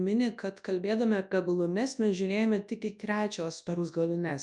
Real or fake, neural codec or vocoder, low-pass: fake; codec, 24 kHz, 0.9 kbps, WavTokenizer, large speech release; 10.8 kHz